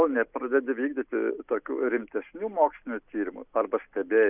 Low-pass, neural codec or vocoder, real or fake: 3.6 kHz; none; real